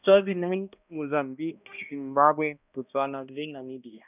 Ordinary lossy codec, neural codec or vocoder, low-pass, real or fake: none; codec, 16 kHz, 1 kbps, X-Codec, HuBERT features, trained on balanced general audio; 3.6 kHz; fake